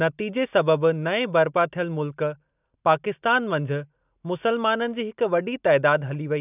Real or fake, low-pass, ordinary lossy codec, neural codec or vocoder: real; 3.6 kHz; none; none